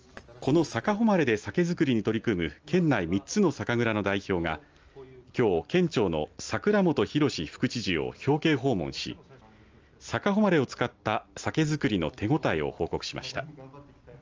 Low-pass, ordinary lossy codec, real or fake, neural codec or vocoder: 7.2 kHz; Opus, 24 kbps; real; none